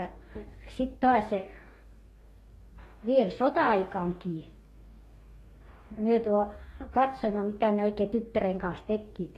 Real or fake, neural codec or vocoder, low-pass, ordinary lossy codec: fake; codec, 44.1 kHz, 2.6 kbps, DAC; 14.4 kHz; AAC, 48 kbps